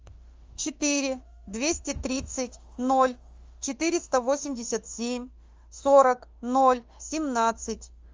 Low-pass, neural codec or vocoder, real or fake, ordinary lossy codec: 7.2 kHz; autoencoder, 48 kHz, 32 numbers a frame, DAC-VAE, trained on Japanese speech; fake; Opus, 32 kbps